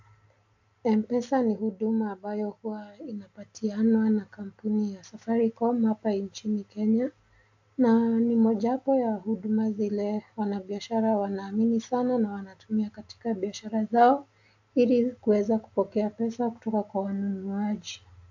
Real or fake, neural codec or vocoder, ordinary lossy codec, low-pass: real; none; AAC, 48 kbps; 7.2 kHz